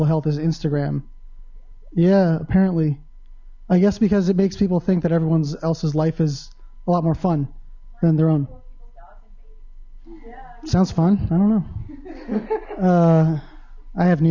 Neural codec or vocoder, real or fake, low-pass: none; real; 7.2 kHz